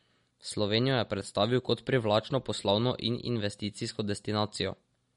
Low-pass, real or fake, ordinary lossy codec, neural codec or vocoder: 10.8 kHz; real; MP3, 48 kbps; none